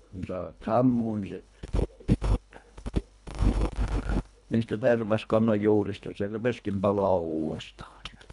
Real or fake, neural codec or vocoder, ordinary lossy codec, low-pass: fake; codec, 24 kHz, 1.5 kbps, HILCodec; none; 10.8 kHz